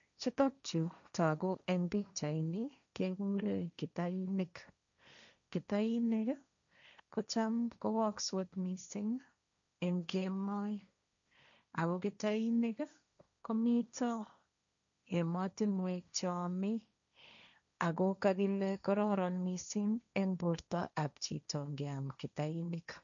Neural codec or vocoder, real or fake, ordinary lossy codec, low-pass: codec, 16 kHz, 1.1 kbps, Voila-Tokenizer; fake; none; 7.2 kHz